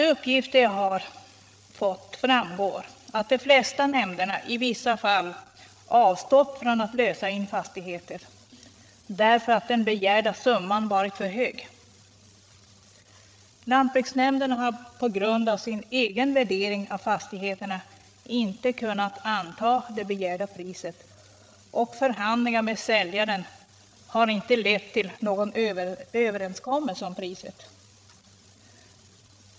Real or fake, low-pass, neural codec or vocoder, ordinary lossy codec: fake; none; codec, 16 kHz, 8 kbps, FreqCodec, larger model; none